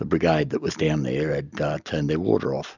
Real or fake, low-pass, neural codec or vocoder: real; 7.2 kHz; none